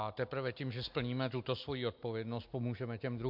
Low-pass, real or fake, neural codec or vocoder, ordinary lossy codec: 5.4 kHz; real; none; MP3, 48 kbps